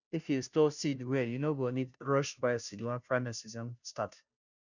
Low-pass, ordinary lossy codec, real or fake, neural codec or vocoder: 7.2 kHz; none; fake; codec, 16 kHz, 0.5 kbps, FunCodec, trained on Chinese and English, 25 frames a second